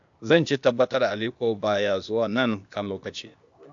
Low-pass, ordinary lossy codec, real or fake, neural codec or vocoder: 7.2 kHz; AAC, 64 kbps; fake; codec, 16 kHz, 0.8 kbps, ZipCodec